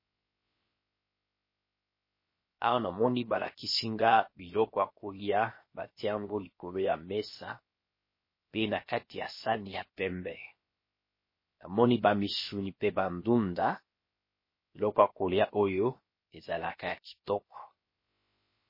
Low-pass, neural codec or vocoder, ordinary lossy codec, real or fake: 5.4 kHz; codec, 16 kHz, 0.7 kbps, FocalCodec; MP3, 24 kbps; fake